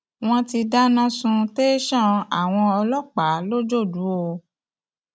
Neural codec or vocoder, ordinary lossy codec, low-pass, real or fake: none; none; none; real